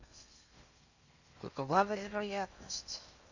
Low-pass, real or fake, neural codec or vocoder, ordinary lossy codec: 7.2 kHz; fake; codec, 16 kHz in and 24 kHz out, 0.6 kbps, FocalCodec, streaming, 4096 codes; Opus, 64 kbps